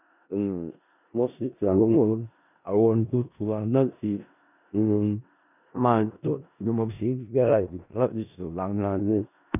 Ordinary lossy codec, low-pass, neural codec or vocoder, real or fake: none; 3.6 kHz; codec, 16 kHz in and 24 kHz out, 0.4 kbps, LongCat-Audio-Codec, four codebook decoder; fake